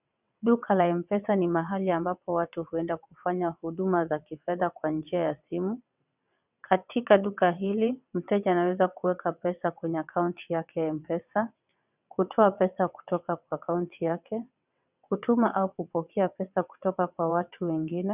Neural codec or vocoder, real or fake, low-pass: vocoder, 22.05 kHz, 80 mel bands, WaveNeXt; fake; 3.6 kHz